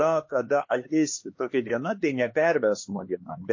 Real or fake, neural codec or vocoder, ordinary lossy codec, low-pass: fake; codec, 16 kHz, 2 kbps, X-Codec, HuBERT features, trained on LibriSpeech; MP3, 32 kbps; 7.2 kHz